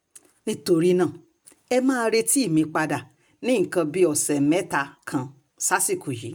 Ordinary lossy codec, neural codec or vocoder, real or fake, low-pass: none; none; real; none